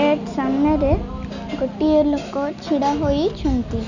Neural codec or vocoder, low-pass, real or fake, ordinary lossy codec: none; 7.2 kHz; real; none